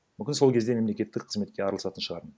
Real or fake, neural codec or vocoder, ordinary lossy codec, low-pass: real; none; none; none